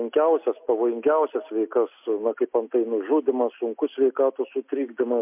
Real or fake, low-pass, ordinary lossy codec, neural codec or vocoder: real; 3.6 kHz; MP3, 32 kbps; none